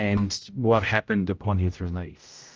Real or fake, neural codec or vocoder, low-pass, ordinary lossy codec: fake; codec, 16 kHz, 0.5 kbps, X-Codec, HuBERT features, trained on general audio; 7.2 kHz; Opus, 24 kbps